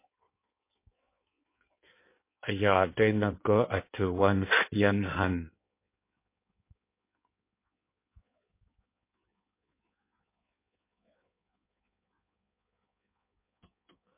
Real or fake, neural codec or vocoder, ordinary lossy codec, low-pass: fake; codec, 16 kHz in and 24 kHz out, 1.1 kbps, FireRedTTS-2 codec; MP3, 24 kbps; 3.6 kHz